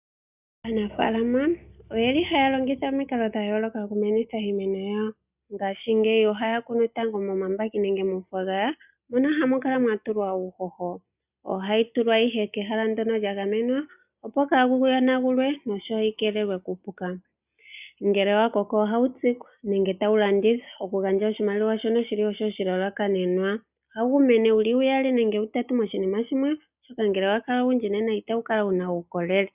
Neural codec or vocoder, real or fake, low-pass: none; real; 3.6 kHz